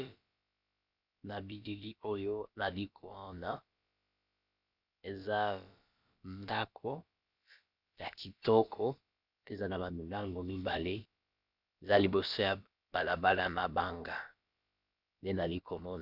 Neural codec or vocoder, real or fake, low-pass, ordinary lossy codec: codec, 16 kHz, about 1 kbps, DyCAST, with the encoder's durations; fake; 5.4 kHz; Opus, 64 kbps